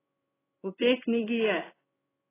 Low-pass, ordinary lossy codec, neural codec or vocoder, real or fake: 3.6 kHz; AAC, 16 kbps; codec, 16 kHz in and 24 kHz out, 1 kbps, XY-Tokenizer; fake